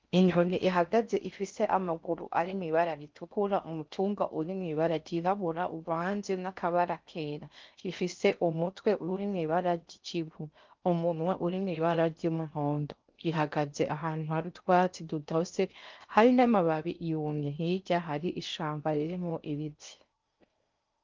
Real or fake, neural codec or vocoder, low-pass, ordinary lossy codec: fake; codec, 16 kHz in and 24 kHz out, 0.6 kbps, FocalCodec, streaming, 4096 codes; 7.2 kHz; Opus, 24 kbps